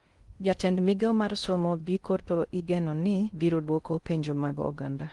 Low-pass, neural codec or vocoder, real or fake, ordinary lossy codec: 10.8 kHz; codec, 16 kHz in and 24 kHz out, 0.6 kbps, FocalCodec, streaming, 2048 codes; fake; Opus, 24 kbps